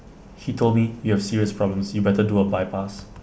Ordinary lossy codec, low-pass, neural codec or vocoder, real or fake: none; none; none; real